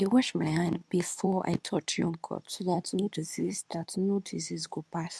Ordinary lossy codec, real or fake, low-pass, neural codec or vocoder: none; fake; none; codec, 24 kHz, 0.9 kbps, WavTokenizer, medium speech release version 2